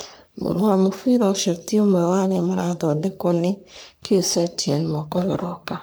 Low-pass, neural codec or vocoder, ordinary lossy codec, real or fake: none; codec, 44.1 kHz, 3.4 kbps, Pupu-Codec; none; fake